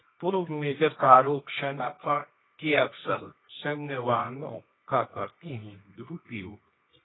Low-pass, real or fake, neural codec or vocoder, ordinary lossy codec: 7.2 kHz; fake; codec, 24 kHz, 0.9 kbps, WavTokenizer, medium music audio release; AAC, 16 kbps